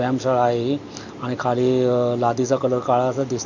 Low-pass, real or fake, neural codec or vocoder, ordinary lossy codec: 7.2 kHz; real; none; none